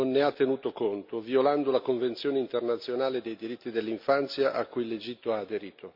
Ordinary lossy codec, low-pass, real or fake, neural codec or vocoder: MP3, 48 kbps; 5.4 kHz; real; none